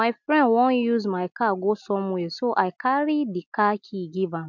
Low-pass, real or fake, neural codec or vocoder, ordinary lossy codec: 7.2 kHz; real; none; MP3, 64 kbps